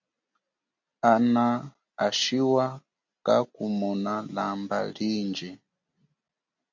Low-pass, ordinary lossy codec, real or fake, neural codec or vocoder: 7.2 kHz; MP3, 64 kbps; real; none